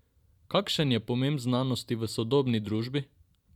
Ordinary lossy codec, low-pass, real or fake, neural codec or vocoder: none; 19.8 kHz; fake; vocoder, 44.1 kHz, 128 mel bands every 512 samples, BigVGAN v2